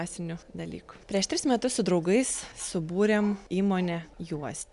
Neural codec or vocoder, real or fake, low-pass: none; real; 10.8 kHz